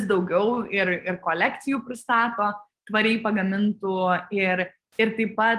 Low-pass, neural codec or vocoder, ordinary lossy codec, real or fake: 14.4 kHz; none; Opus, 24 kbps; real